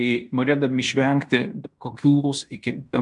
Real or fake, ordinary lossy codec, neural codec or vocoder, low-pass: fake; MP3, 96 kbps; codec, 16 kHz in and 24 kHz out, 0.9 kbps, LongCat-Audio-Codec, fine tuned four codebook decoder; 10.8 kHz